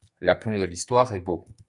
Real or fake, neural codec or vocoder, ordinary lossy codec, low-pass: fake; codec, 44.1 kHz, 2.6 kbps, SNAC; Opus, 64 kbps; 10.8 kHz